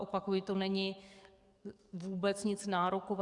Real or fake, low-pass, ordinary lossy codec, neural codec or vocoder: fake; 10.8 kHz; Opus, 64 kbps; codec, 44.1 kHz, 7.8 kbps, DAC